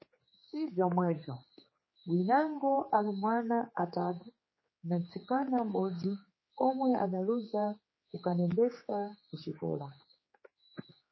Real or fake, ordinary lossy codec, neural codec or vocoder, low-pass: fake; MP3, 24 kbps; codec, 16 kHz, 4 kbps, X-Codec, HuBERT features, trained on general audio; 7.2 kHz